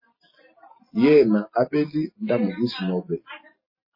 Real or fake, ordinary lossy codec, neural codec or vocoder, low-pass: real; MP3, 24 kbps; none; 5.4 kHz